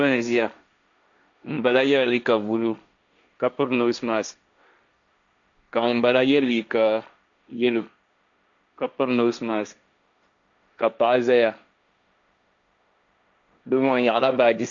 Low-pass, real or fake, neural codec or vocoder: 7.2 kHz; fake; codec, 16 kHz, 1.1 kbps, Voila-Tokenizer